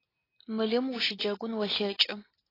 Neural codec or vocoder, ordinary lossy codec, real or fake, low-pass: none; AAC, 24 kbps; real; 5.4 kHz